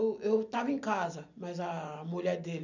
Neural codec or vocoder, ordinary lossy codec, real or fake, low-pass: none; none; real; 7.2 kHz